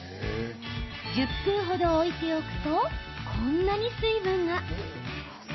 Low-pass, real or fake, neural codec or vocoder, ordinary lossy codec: 7.2 kHz; real; none; MP3, 24 kbps